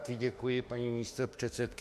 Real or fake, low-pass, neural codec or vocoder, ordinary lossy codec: fake; 14.4 kHz; autoencoder, 48 kHz, 32 numbers a frame, DAC-VAE, trained on Japanese speech; MP3, 64 kbps